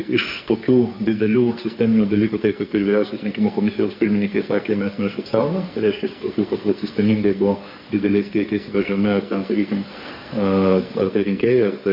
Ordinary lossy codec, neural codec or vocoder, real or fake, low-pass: MP3, 48 kbps; codec, 44.1 kHz, 2.6 kbps, SNAC; fake; 5.4 kHz